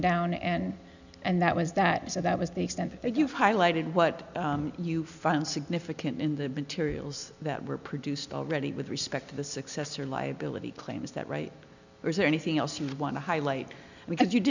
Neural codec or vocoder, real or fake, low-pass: none; real; 7.2 kHz